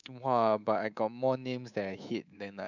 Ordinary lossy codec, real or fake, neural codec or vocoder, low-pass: none; fake; codec, 24 kHz, 3.1 kbps, DualCodec; 7.2 kHz